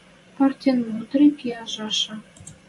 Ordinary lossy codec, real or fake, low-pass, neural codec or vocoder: AAC, 64 kbps; fake; 10.8 kHz; vocoder, 24 kHz, 100 mel bands, Vocos